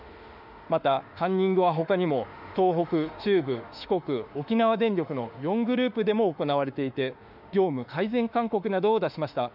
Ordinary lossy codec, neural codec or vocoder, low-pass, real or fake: none; autoencoder, 48 kHz, 32 numbers a frame, DAC-VAE, trained on Japanese speech; 5.4 kHz; fake